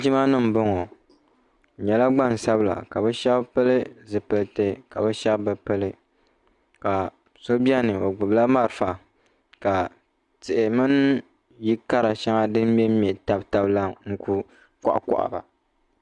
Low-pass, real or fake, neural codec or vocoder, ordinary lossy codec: 10.8 kHz; real; none; Opus, 64 kbps